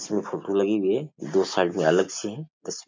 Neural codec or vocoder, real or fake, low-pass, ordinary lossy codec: none; real; 7.2 kHz; MP3, 64 kbps